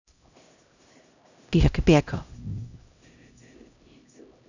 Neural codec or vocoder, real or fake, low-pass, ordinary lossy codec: codec, 16 kHz, 0.5 kbps, X-Codec, HuBERT features, trained on LibriSpeech; fake; 7.2 kHz; none